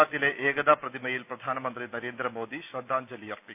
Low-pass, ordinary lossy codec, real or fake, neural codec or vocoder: 3.6 kHz; none; real; none